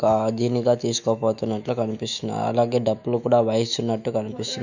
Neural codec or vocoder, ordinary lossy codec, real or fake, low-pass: autoencoder, 48 kHz, 128 numbers a frame, DAC-VAE, trained on Japanese speech; none; fake; 7.2 kHz